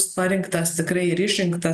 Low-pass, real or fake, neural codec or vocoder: 14.4 kHz; real; none